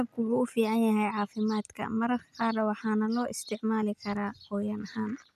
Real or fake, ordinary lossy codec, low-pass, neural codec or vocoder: real; none; 14.4 kHz; none